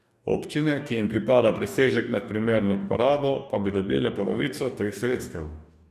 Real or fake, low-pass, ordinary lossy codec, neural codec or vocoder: fake; 14.4 kHz; none; codec, 44.1 kHz, 2.6 kbps, DAC